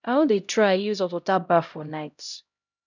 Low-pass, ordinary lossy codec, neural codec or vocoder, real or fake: 7.2 kHz; none; codec, 16 kHz, 0.5 kbps, X-Codec, HuBERT features, trained on LibriSpeech; fake